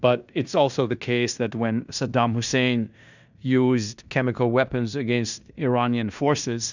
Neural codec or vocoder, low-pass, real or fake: codec, 16 kHz in and 24 kHz out, 0.9 kbps, LongCat-Audio-Codec, fine tuned four codebook decoder; 7.2 kHz; fake